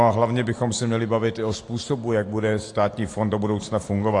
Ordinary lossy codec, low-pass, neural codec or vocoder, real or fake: AAC, 48 kbps; 10.8 kHz; none; real